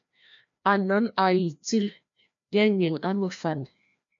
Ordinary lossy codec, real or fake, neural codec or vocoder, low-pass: AAC, 64 kbps; fake; codec, 16 kHz, 1 kbps, FreqCodec, larger model; 7.2 kHz